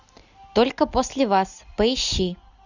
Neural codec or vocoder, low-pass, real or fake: none; 7.2 kHz; real